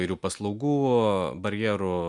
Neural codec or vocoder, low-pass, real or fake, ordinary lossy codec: none; 10.8 kHz; real; MP3, 96 kbps